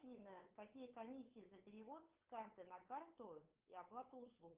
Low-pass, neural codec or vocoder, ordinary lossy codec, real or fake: 3.6 kHz; codec, 16 kHz, 8 kbps, FunCodec, trained on LibriTTS, 25 frames a second; Opus, 32 kbps; fake